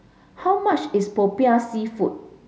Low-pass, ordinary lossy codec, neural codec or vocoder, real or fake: none; none; none; real